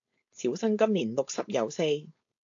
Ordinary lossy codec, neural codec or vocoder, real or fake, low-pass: AAC, 48 kbps; codec, 16 kHz, 4.8 kbps, FACodec; fake; 7.2 kHz